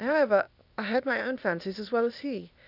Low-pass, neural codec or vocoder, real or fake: 5.4 kHz; codec, 16 kHz, 0.8 kbps, ZipCodec; fake